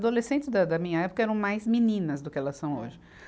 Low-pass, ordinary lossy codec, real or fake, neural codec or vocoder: none; none; real; none